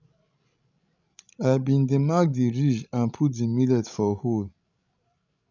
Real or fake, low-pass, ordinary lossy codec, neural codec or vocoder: fake; 7.2 kHz; none; codec, 16 kHz, 16 kbps, FreqCodec, larger model